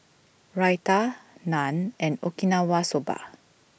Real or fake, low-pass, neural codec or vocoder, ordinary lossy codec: real; none; none; none